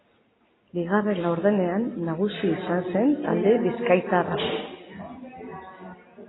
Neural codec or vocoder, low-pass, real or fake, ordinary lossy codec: none; 7.2 kHz; real; AAC, 16 kbps